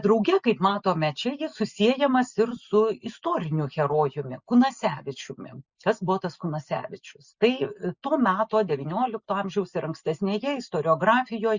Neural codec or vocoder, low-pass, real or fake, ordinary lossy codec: none; 7.2 kHz; real; Opus, 64 kbps